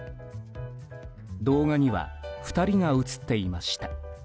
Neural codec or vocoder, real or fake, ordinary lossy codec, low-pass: none; real; none; none